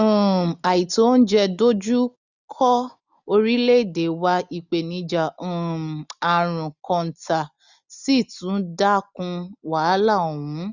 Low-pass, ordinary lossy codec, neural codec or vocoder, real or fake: 7.2 kHz; none; none; real